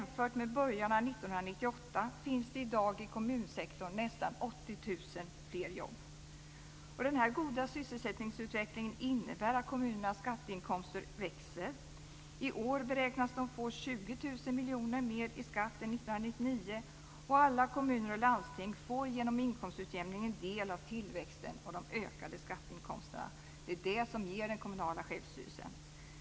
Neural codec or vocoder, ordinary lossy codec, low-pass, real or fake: none; none; none; real